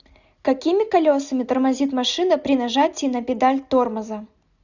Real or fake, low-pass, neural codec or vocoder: real; 7.2 kHz; none